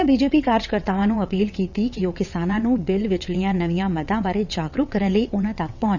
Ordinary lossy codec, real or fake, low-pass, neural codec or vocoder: none; fake; 7.2 kHz; vocoder, 22.05 kHz, 80 mel bands, WaveNeXt